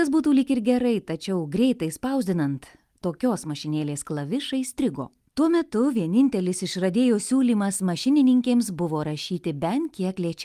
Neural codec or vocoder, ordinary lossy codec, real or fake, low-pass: none; Opus, 32 kbps; real; 14.4 kHz